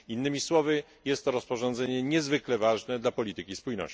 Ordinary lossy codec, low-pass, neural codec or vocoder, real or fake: none; none; none; real